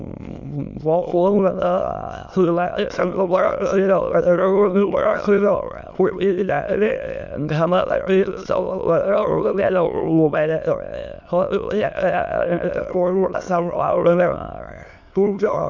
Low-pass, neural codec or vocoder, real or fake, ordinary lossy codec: 7.2 kHz; autoencoder, 22.05 kHz, a latent of 192 numbers a frame, VITS, trained on many speakers; fake; none